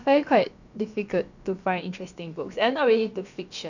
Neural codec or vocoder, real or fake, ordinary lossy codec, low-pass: codec, 16 kHz, about 1 kbps, DyCAST, with the encoder's durations; fake; none; 7.2 kHz